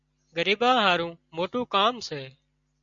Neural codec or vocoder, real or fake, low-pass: none; real; 7.2 kHz